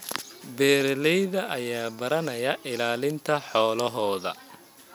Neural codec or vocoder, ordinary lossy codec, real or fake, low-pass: none; none; real; 19.8 kHz